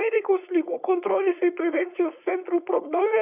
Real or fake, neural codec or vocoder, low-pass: fake; codec, 16 kHz, 4.8 kbps, FACodec; 3.6 kHz